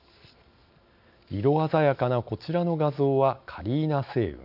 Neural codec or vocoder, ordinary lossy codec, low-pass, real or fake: none; AAC, 48 kbps; 5.4 kHz; real